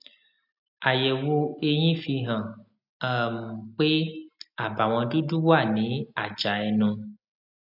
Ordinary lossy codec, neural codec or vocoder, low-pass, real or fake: none; none; 5.4 kHz; real